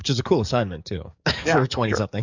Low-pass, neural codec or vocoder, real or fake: 7.2 kHz; codec, 44.1 kHz, 7.8 kbps, DAC; fake